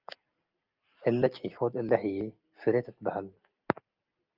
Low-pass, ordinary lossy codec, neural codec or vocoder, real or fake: 5.4 kHz; Opus, 24 kbps; none; real